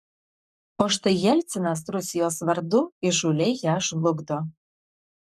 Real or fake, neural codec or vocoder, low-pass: fake; codec, 44.1 kHz, 7.8 kbps, Pupu-Codec; 14.4 kHz